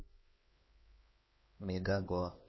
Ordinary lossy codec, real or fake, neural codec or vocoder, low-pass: MP3, 24 kbps; fake; codec, 16 kHz, 2 kbps, X-Codec, HuBERT features, trained on LibriSpeech; 7.2 kHz